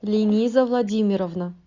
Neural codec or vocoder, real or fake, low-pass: none; real; 7.2 kHz